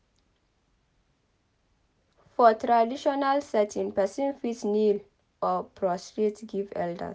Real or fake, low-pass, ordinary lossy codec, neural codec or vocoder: real; none; none; none